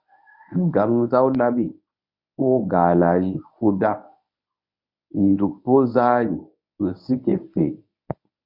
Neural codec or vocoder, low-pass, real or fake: codec, 24 kHz, 0.9 kbps, WavTokenizer, medium speech release version 1; 5.4 kHz; fake